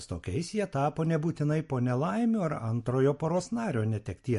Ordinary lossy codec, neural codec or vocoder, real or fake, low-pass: MP3, 48 kbps; none; real; 14.4 kHz